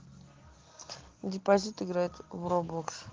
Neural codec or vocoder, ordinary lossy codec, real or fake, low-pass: none; Opus, 16 kbps; real; 7.2 kHz